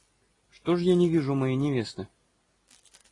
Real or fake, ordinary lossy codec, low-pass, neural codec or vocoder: real; AAC, 32 kbps; 10.8 kHz; none